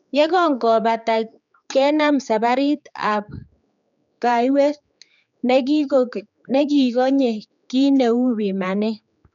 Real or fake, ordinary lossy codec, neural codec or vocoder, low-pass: fake; none; codec, 16 kHz, 4 kbps, X-Codec, HuBERT features, trained on general audio; 7.2 kHz